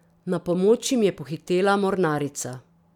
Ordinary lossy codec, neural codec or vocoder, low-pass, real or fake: none; none; 19.8 kHz; real